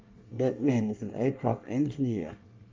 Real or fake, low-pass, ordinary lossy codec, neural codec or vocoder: fake; 7.2 kHz; Opus, 32 kbps; codec, 24 kHz, 1 kbps, SNAC